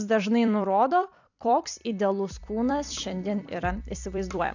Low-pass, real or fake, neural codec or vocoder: 7.2 kHz; fake; vocoder, 44.1 kHz, 128 mel bands every 256 samples, BigVGAN v2